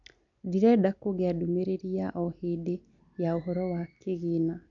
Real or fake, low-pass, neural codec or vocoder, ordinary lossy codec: real; 7.2 kHz; none; none